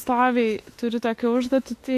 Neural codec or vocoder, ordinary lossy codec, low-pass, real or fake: autoencoder, 48 kHz, 32 numbers a frame, DAC-VAE, trained on Japanese speech; AAC, 64 kbps; 14.4 kHz; fake